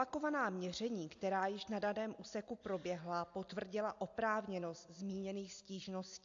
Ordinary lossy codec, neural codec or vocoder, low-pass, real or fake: AAC, 48 kbps; none; 7.2 kHz; real